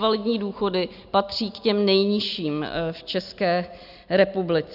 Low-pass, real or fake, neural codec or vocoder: 5.4 kHz; real; none